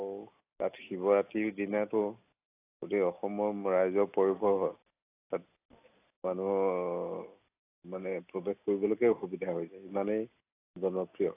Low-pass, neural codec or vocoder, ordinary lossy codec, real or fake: 3.6 kHz; none; AAC, 24 kbps; real